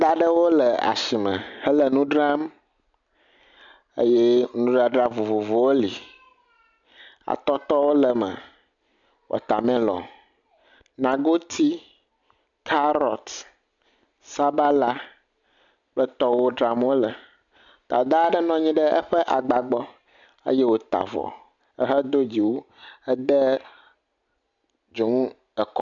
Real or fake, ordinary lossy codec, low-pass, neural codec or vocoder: real; MP3, 96 kbps; 7.2 kHz; none